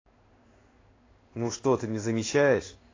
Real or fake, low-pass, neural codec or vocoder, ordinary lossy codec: fake; 7.2 kHz; codec, 16 kHz in and 24 kHz out, 1 kbps, XY-Tokenizer; AAC, 32 kbps